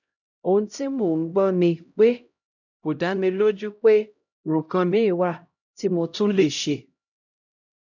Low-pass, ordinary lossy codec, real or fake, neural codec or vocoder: 7.2 kHz; none; fake; codec, 16 kHz, 0.5 kbps, X-Codec, HuBERT features, trained on LibriSpeech